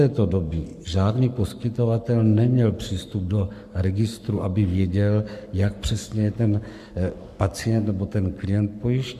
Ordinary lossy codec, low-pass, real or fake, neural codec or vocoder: MP3, 96 kbps; 14.4 kHz; fake; codec, 44.1 kHz, 7.8 kbps, Pupu-Codec